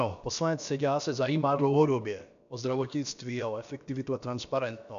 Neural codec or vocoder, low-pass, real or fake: codec, 16 kHz, about 1 kbps, DyCAST, with the encoder's durations; 7.2 kHz; fake